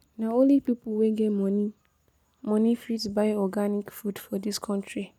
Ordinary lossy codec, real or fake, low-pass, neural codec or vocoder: none; real; 19.8 kHz; none